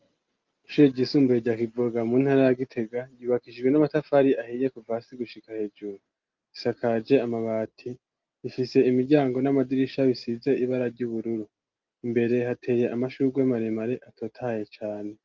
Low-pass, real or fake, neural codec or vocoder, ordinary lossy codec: 7.2 kHz; real; none; Opus, 24 kbps